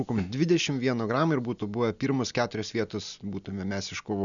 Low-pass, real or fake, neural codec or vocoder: 7.2 kHz; real; none